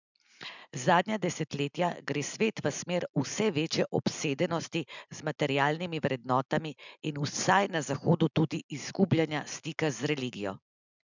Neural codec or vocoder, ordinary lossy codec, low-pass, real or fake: none; none; 7.2 kHz; real